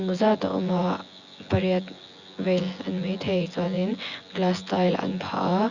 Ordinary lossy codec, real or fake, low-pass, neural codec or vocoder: Opus, 64 kbps; fake; 7.2 kHz; vocoder, 24 kHz, 100 mel bands, Vocos